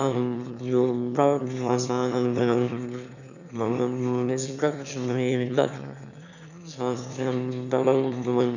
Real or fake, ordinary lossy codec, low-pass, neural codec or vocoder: fake; none; 7.2 kHz; autoencoder, 22.05 kHz, a latent of 192 numbers a frame, VITS, trained on one speaker